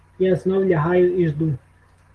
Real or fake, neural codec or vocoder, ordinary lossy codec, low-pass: real; none; Opus, 16 kbps; 10.8 kHz